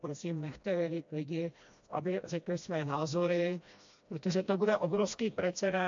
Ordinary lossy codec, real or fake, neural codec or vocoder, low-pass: AAC, 64 kbps; fake; codec, 16 kHz, 1 kbps, FreqCodec, smaller model; 7.2 kHz